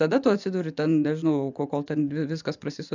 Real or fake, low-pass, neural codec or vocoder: fake; 7.2 kHz; vocoder, 24 kHz, 100 mel bands, Vocos